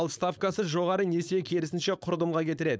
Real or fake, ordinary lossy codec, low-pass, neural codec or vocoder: fake; none; none; codec, 16 kHz, 4.8 kbps, FACodec